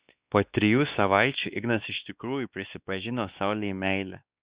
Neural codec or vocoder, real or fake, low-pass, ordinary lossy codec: codec, 16 kHz, 2 kbps, X-Codec, WavLM features, trained on Multilingual LibriSpeech; fake; 3.6 kHz; Opus, 64 kbps